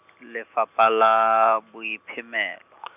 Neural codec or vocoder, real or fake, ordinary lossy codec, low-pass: none; real; none; 3.6 kHz